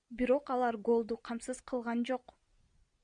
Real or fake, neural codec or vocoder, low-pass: real; none; 9.9 kHz